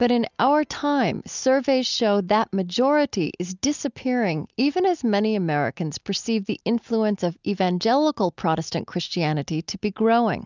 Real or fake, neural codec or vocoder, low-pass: real; none; 7.2 kHz